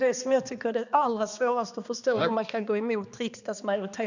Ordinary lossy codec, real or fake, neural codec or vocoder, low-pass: none; fake; codec, 16 kHz, 2 kbps, X-Codec, HuBERT features, trained on balanced general audio; 7.2 kHz